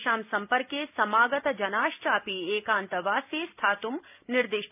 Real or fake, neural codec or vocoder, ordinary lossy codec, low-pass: real; none; none; 3.6 kHz